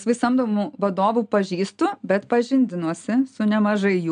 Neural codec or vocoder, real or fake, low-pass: none; real; 9.9 kHz